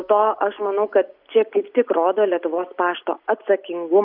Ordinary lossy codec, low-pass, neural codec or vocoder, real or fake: AAC, 48 kbps; 5.4 kHz; none; real